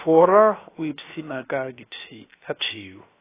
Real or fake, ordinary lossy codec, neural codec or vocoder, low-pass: fake; AAC, 16 kbps; codec, 16 kHz, about 1 kbps, DyCAST, with the encoder's durations; 3.6 kHz